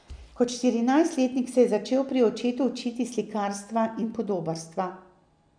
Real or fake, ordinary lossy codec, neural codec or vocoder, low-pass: real; none; none; 9.9 kHz